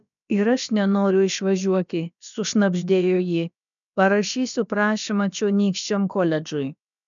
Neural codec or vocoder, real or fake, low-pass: codec, 16 kHz, about 1 kbps, DyCAST, with the encoder's durations; fake; 7.2 kHz